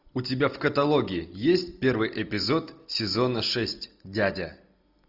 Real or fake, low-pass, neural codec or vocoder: real; 5.4 kHz; none